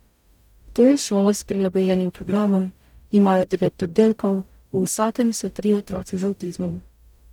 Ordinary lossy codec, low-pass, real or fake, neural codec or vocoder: none; 19.8 kHz; fake; codec, 44.1 kHz, 0.9 kbps, DAC